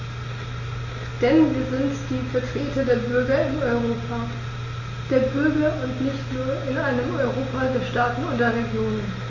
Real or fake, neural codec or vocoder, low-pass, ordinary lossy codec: real; none; 7.2 kHz; MP3, 32 kbps